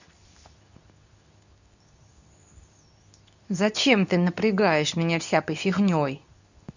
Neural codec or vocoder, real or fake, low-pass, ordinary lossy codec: codec, 24 kHz, 0.9 kbps, WavTokenizer, medium speech release version 2; fake; 7.2 kHz; none